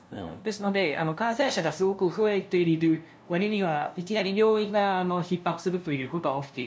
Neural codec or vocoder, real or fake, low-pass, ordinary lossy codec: codec, 16 kHz, 0.5 kbps, FunCodec, trained on LibriTTS, 25 frames a second; fake; none; none